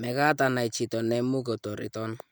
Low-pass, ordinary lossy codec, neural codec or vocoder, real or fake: none; none; none; real